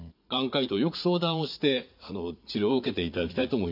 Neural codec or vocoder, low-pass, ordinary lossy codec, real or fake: vocoder, 44.1 kHz, 80 mel bands, Vocos; 5.4 kHz; none; fake